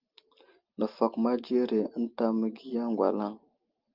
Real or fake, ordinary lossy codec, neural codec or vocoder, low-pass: real; Opus, 32 kbps; none; 5.4 kHz